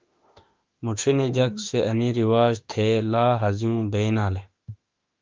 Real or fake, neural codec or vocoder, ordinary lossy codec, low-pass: fake; autoencoder, 48 kHz, 32 numbers a frame, DAC-VAE, trained on Japanese speech; Opus, 32 kbps; 7.2 kHz